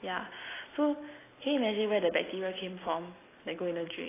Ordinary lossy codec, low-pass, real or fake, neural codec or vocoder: AAC, 16 kbps; 3.6 kHz; real; none